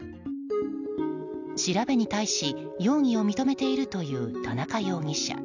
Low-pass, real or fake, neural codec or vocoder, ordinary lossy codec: 7.2 kHz; real; none; none